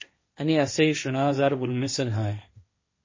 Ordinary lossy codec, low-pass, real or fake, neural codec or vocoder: MP3, 32 kbps; 7.2 kHz; fake; codec, 16 kHz, 1.1 kbps, Voila-Tokenizer